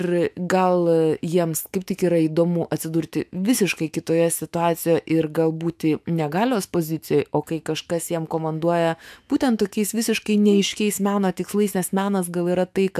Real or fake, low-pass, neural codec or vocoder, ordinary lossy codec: fake; 14.4 kHz; autoencoder, 48 kHz, 128 numbers a frame, DAC-VAE, trained on Japanese speech; AAC, 96 kbps